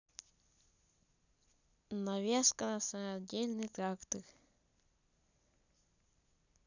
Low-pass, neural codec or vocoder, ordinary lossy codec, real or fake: 7.2 kHz; none; none; real